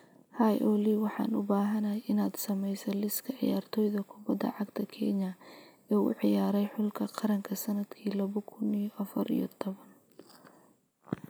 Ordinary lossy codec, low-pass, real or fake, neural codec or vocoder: none; none; real; none